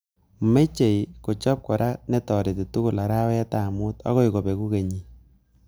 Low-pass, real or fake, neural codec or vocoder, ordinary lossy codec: none; real; none; none